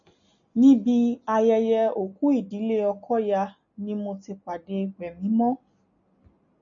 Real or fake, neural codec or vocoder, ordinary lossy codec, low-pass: real; none; MP3, 96 kbps; 7.2 kHz